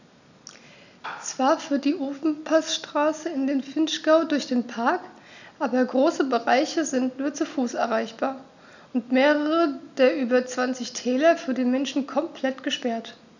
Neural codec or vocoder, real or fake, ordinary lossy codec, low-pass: none; real; none; 7.2 kHz